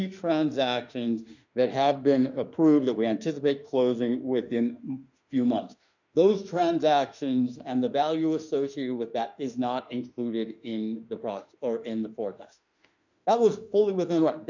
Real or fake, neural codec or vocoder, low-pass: fake; autoencoder, 48 kHz, 32 numbers a frame, DAC-VAE, trained on Japanese speech; 7.2 kHz